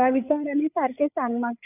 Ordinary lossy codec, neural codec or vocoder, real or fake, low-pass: none; codec, 16 kHz, 8 kbps, FreqCodec, larger model; fake; 3.6 kHz